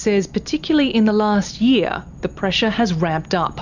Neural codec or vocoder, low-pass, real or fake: none; 7.2 kHz; real